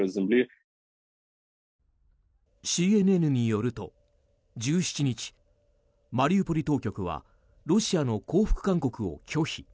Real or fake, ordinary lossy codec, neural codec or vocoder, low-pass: real; none; none; none